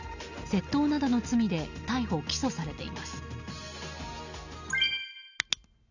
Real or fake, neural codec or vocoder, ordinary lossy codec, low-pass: real; none; none; 7.2 kHz